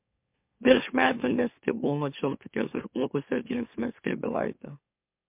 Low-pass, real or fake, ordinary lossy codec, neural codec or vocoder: 3.6 kHz; fake; MP3, 32 kbps; autoencoder, 44.1 kHz, a latent of 192 numbers a frame, MeloTTS